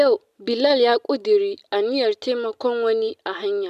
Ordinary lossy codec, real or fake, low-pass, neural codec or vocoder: none; real; 14.4 kHz; none